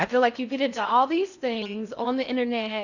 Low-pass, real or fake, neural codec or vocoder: 7.2 kHz; fake; codec, 16 kHz in and 24 kHz out, 0.6 kbps, FocalCodec, streaming, 2048 codes